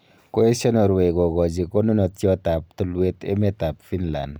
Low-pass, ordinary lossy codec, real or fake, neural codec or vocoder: none; none; real; none